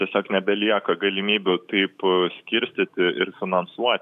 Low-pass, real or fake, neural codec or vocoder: 14.4 kHz; fake; autoencoder, 48 kHz, 128 numbers a frame, DAC-VAE, trained on Japanese speech